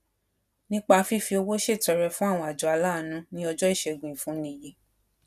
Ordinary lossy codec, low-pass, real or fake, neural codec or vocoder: none; 14.4 kHz; real; none